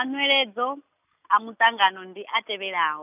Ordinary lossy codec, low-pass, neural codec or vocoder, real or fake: none; 3.6 kHz; none; real